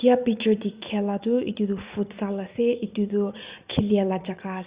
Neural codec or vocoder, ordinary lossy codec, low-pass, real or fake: vocoder, 44.1 kHz, 80 mel bands, Vocos; Opus, 64 kbps; 3.6 kHz; fake